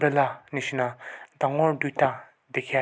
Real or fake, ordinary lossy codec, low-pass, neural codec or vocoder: real; none; none; none